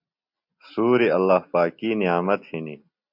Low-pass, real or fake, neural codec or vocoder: 5.4 kHz; real; none